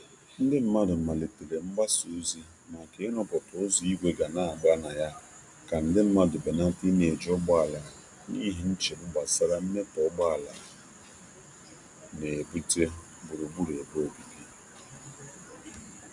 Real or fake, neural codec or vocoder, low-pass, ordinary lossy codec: real; none; 10.8 kHz; none